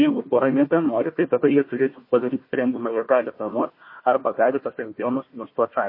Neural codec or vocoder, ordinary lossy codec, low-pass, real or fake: codec, 16 kHz, 1 kbps, FunCodec, trained on Chinese and English, 50 frames a second; MP3, 24 kbps; 5.4 kHz; fake